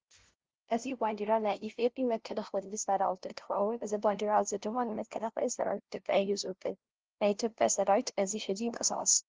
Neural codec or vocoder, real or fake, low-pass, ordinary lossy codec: codec, 16 kHz, 0.5 kbps, FunCodec, trained on LibriTTS, 25 frames a second; fake; 7.2 kHz; Opus, 16 kbps